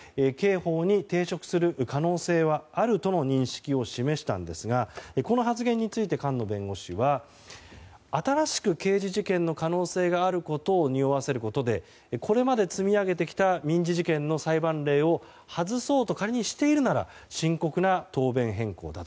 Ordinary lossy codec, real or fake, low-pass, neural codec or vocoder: none; real; none; none